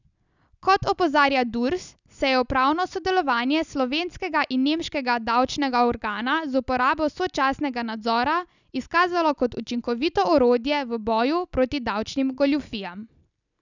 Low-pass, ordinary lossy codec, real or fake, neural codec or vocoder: 7.2 kHz; none; real; none